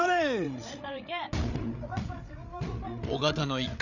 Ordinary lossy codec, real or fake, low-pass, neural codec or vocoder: none; fake; 7.2 kHz; codec, 16 kHz, 8 kbps, FreqCodec, larger model